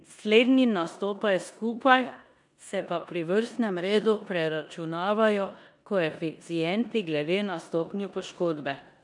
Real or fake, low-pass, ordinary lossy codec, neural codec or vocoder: fake; 10.8 kHz; none; codec, 16 kHz in and 24 kHz out, 0.9 kbps, LongCat-Audio-Codec, four codebook decoder